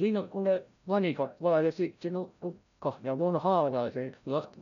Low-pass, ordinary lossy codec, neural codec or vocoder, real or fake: 7.2 kHz; none; codec, 16 kHz, 0.5 kbps, FreqCodec, larger model; fake